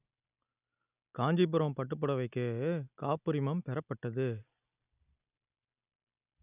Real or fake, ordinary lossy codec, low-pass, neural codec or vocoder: real; none; 3.6 kHz; none